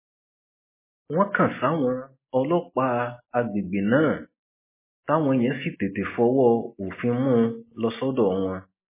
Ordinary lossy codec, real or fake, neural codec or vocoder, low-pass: MP3, 16 kbps; real; none; 3.6 kHz